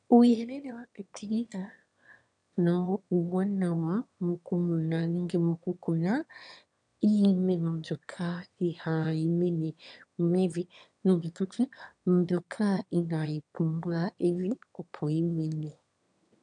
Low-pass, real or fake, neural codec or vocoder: 9.9 kHz; fake; autoencoder, 22.05 kHz, a latent of 192 numbers a frame, VITS, trained on one speaker